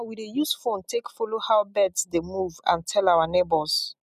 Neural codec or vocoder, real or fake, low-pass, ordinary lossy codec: none; real; 14.4 kHz; none